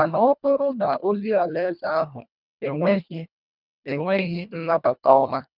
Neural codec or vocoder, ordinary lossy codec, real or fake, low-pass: codec, 24 kHz, 1.5 kbps, HILCodec; none; fake; 5.4 kHz